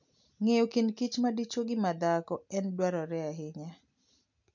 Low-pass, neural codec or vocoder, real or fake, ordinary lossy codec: 7.2 kHz; none; real; none